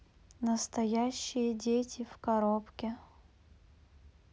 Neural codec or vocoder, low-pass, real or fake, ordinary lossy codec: none; none; real; none